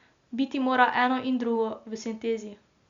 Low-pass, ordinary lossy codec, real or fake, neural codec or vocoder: 7.2 kHz; Opus, 64 kbps; real; none